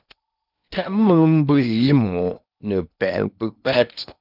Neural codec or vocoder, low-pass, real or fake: codec, 16 kHz in and 24 kHz out, 0.8 kbps, FocalCodec, streaming, 65536 codes; 5.4 kHz; fake